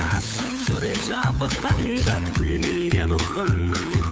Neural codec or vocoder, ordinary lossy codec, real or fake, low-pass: codec, 16 kHz, 8 kbps, FunCodec, trained on LibriTTS, 25 frames a second; none; fake; none